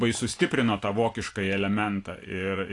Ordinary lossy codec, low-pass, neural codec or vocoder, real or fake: AAC, 48 kbps; 10.8 kHz; none; real